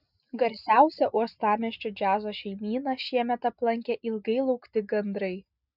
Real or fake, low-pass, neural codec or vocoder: real; 5.4 kHz; none